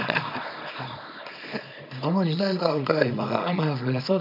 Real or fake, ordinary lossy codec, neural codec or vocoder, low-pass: fake; none; codec, 24 kHz, 0.9 kbps, WavTokenizer, small release; 5.4 kHz